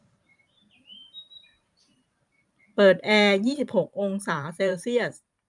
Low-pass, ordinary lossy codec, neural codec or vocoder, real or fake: 10.8 kHz; none; vocoder, 44.1 kHz, 128 mel bands every 512 samples, BigVGAN v2; fake